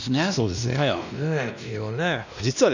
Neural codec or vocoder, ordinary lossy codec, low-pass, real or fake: codec, 16 kHz, 1 kbps, X-Codec, WavLM features, trained on Multilingual LibriSpeech; none; 7.2 kHz; fake